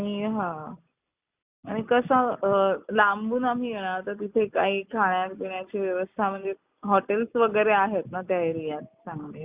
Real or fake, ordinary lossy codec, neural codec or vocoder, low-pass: real; Opus, 64 kbps; none; 3.6 kHz